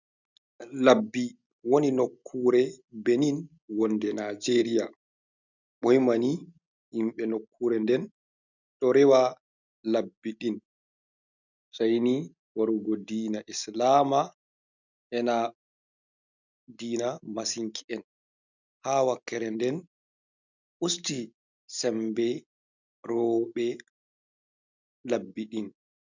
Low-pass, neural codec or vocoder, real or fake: 7.2 kHz; none; real